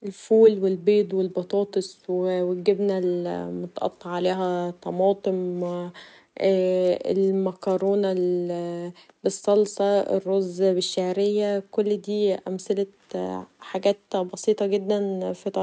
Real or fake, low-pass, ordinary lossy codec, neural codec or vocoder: real; none; none; none